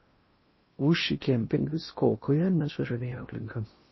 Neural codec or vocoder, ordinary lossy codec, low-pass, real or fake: codec, 16 kHz in and 24 kHz out, 0.6 kbps, FocalCodec, streaming, 4096 codes; MP3, 24 kbps; 7.2 kHz; fake